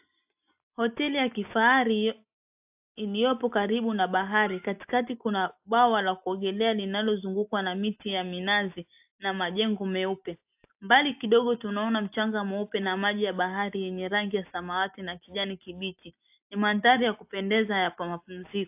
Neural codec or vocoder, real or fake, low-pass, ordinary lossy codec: none; real; 3.6 kHz; AAC, 32 kbps